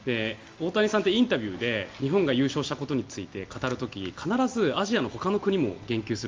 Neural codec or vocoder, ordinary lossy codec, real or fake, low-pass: none; Opus, 32 kbps; real; 7.2 kHz